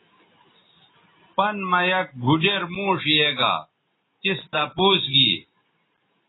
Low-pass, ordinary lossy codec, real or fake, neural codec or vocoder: 7.2 kHz; AAC, 16 kbps; real; none